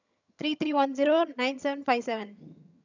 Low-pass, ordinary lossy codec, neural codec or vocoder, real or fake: 7.2 kHz; none; vocoder, 22.05 kHz, 80 mel bands, HiFi-GAN; fake